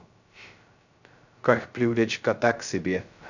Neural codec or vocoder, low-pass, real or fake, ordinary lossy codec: codec, 16 kHz, 0.2 kbps, FocalCodec; 7.2 kHz; fake; none